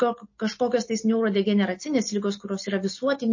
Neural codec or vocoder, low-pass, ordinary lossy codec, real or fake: none; 7.2 kHz; MP3, 32 kbps; real